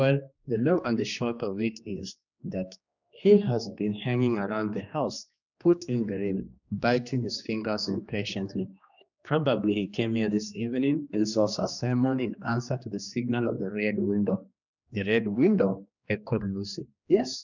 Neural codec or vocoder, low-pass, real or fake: codec, 16 kHz, 2 kbps, X-Codec, HuBERT features, trained on general audio; 7.2 kHz; fake